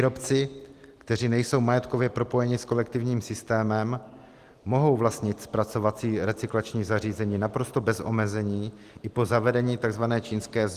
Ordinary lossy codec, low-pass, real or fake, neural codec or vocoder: Opus, 24 kbps; 14.4 kHz; real; none